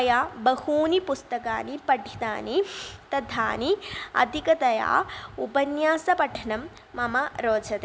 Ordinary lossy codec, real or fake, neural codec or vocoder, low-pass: none; real; none; none